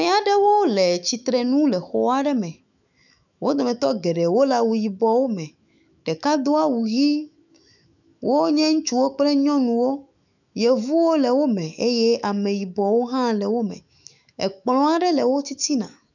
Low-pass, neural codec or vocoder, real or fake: 7.2 kHz; autoencoder, 48 kHz, 128 numbers a frame, DAC-VAE, trained on Japanese speech; fake